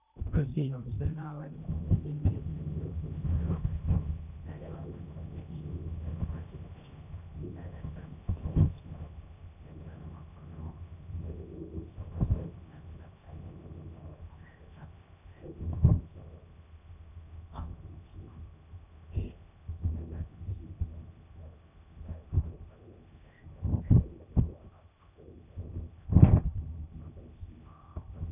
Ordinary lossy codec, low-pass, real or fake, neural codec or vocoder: none; 3.6 kHz; fake; codec, 16 kHz in and 24 kHz out, 0.8 kbps, FocalCodec, streaming, 65536 codes